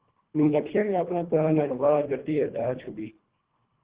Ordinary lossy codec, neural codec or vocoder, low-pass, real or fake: Opus, 16 kbps; codec, 24 kHz, 1.5 kbps, HILCodec; 3.6 kHz; fake